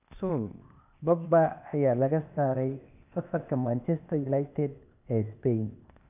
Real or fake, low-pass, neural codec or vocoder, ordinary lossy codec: fake; 3.6 kHz; codec, 16 kHz, 0.8 kbps, ZipCodec; none